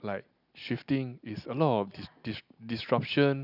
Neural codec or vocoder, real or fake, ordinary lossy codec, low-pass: none; real; none; 5.4 kHz